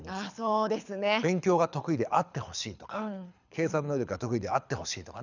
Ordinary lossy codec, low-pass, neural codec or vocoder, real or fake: none; 7.2 kHz; codec, 24 kHz, 6 kbps, HILCodec; fake